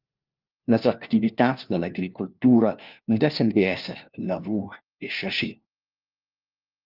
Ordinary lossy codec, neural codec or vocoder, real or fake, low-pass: Opus, 24 kbps; codec, 16 kHz, 1 kbps, FunCodec, trained on LibriTTS, 50 frames a second; fake; 5.4 kHz